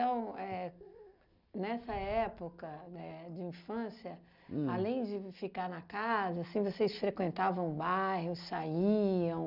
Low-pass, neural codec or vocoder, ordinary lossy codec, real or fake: 5.4 kHz; none; none; real